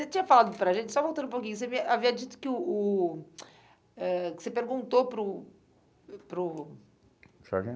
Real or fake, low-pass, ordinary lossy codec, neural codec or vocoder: real; none; none; none